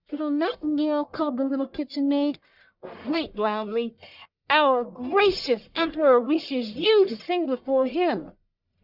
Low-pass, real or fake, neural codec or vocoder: 5.4 kHz; fake; codec, 44.1 kHz, 1.7 kbps, Pupu-Codec